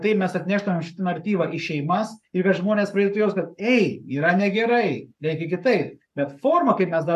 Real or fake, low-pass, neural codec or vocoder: fake; 14.4 kHz; codec, 44.1 kHz, 7.8 kbps, Pupu-Codec